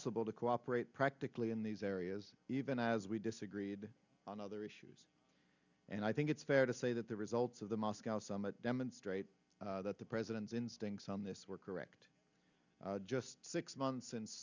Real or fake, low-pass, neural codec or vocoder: real; 7.2 kHz; none